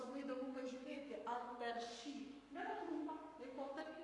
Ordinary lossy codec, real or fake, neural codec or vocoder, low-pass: AAC, 48 kbps; fake; codec, 44.1 kHz, 7.8 kbps, Pupu-Codec; 10.8 kHz